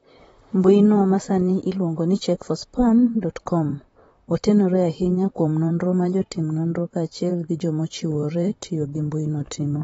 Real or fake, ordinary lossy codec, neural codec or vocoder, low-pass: fake; AAC, 24 kbps; vocoder, 44.1 kHz, 128 mel bands, Pupu-Vocoder; 19.8 kHz